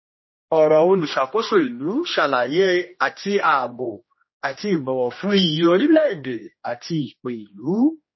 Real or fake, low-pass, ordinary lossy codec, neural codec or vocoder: fake; 7.2 kHz; MP3, 24 kbps; codec, 16 kHz, 1 kbps, X-Codec, HuBERT features, trained on general audio